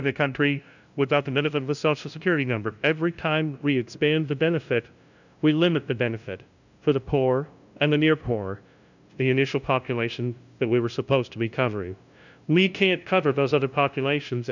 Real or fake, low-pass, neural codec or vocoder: fake; 7.2 kHz; codec, 16 kHz, 0.5 kbps, FunCodec, trained on LibriTTS, 25 frames a second